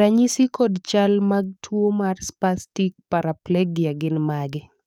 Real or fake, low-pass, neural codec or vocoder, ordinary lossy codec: fake; 19.8 kHz; codec, 44.1 kHz, 7.8 kbps, DAC; none